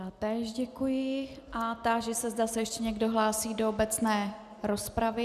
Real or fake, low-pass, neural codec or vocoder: real; 14.4 kHz; none